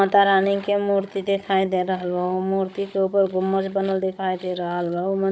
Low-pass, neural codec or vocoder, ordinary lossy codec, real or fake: none; codec, 16 kHz, 16 kbps, FunCodec, trained on Chinese and English, 50 frames a second; none; fake